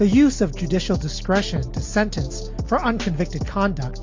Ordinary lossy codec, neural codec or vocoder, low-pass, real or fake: MP3, 48 kbps; none; 7.2 kHz; real